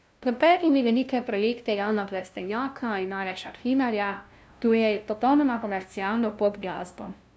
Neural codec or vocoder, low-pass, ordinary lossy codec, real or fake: codec, 16 kHz, 0.5 kbps, FunCodec, trained on LibriTTS, 25 frames a second; none; none; fake